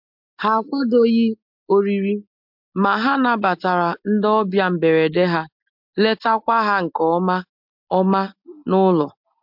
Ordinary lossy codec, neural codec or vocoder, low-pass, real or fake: MP3, 48 kbps; none; 5.4 kHz; real